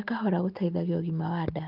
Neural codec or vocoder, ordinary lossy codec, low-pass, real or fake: none; Opus, 32 kbps; 5.4 kHz; real